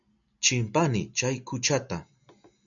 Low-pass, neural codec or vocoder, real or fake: 7.2 kHz; none; real